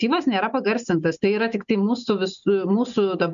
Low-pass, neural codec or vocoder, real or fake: 7.2 kHz; none; real